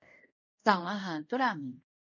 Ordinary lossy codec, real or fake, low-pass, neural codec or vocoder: none; fake; 7.2 kHz; codec, 24 kHz, 0.5 kbps, DualCodec